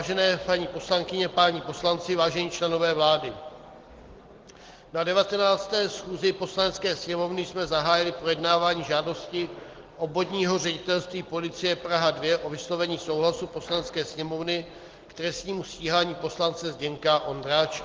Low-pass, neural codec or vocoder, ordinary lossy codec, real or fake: 7.2 kHz; none; Opus, 16 kbps; real